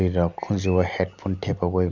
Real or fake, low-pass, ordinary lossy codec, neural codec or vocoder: real; 7.2 kHz; none; none